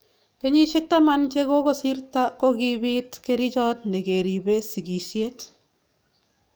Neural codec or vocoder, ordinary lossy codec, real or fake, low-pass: codec, 44.1 kHz, 7.8 kbps, DAC; none; fake; none